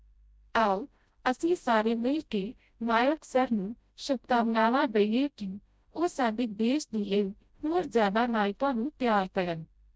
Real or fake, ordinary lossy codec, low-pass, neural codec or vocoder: fake; none; none; codec, 16 kHz, 0.5 kbps, FreqCodec, smaller model